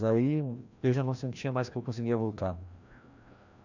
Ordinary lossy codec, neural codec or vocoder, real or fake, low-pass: none; codec, 16 kHz, 1 kbps, FreqCodec, larger model; fake; 7.2 kHz